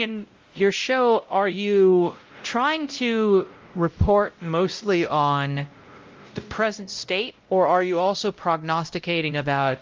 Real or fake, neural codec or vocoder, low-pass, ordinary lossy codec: fake; codec, 16 kHz, 0.5 kbps, X-Codec, WavLM features, trained on Multilingual LibriSpeech; 7.2 kHz; Opus, 32 kbps